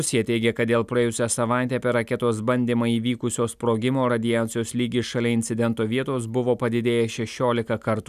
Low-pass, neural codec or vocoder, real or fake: 14.4 kHz; none; real